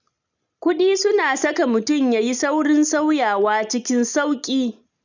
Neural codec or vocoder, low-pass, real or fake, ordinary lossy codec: none; 7.2 kHz; real; none